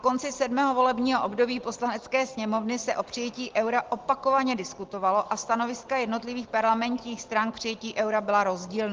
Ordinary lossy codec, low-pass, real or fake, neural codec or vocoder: Opus, 16 kbps; 7.2 kHz; real; none